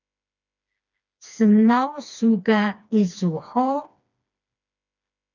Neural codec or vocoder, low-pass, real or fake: codec, 16 kHz, 2 kbps, FreqCodec, smaller model; 7.2 kHz; fake